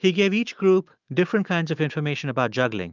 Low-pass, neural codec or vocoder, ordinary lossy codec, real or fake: 7.2 kHz; autoencoder, 48 kHz, 128 numbers a frame, DAC-VAE, trained on Japanese speech; Opus, 24 kbps; fake